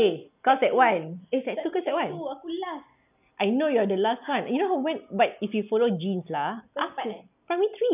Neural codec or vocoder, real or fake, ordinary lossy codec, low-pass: none; real; none; 3.6 kHz